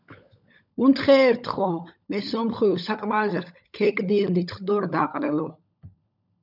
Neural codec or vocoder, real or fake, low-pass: codec, 16 kHz, 16 kbps, FunCodec, trained on LibriTTS, 50 frames a second; fake; 5.4 kHz